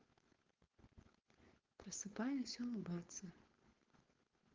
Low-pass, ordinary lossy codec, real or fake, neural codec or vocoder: 7.2 kHz; Opus, 16 kbps; fake; codec, 16 kHz, 4.8 kbps, FACodec